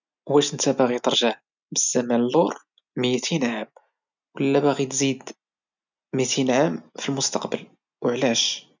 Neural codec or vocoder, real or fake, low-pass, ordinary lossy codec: none; real; 7.2 kHz; none